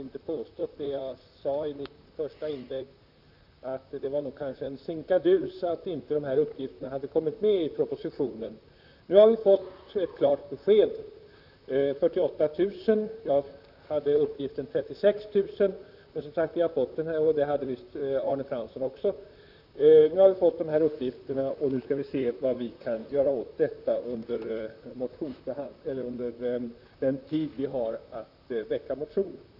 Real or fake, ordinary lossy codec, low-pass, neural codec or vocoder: fake; none; 5.4 kHz; vocoder, 44.1 kHz, 128 mel bands, Pupu-Vocoder